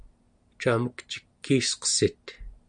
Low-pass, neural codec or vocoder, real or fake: 9.9 kHz; none; real